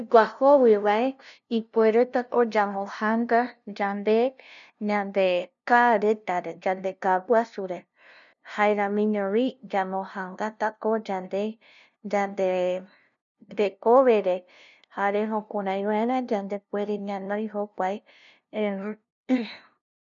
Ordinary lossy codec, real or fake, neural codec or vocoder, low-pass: none; fake; codec, 16 kHz, 0.5 kbps, FunCodec, trained on LibriTTS, 25 frames a second; 7.2 kHz